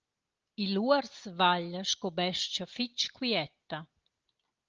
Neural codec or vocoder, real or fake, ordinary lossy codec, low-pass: none; real; Opus, 24 kbps; 7.2 kHz